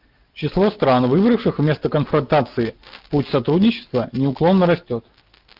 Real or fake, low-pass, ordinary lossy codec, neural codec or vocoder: real; 5.4 kHz; Opus, 32 kbps; none